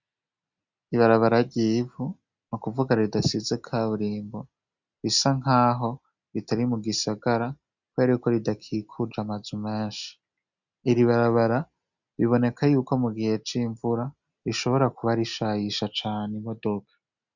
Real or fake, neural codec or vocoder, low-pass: real; none; 7.2 kHz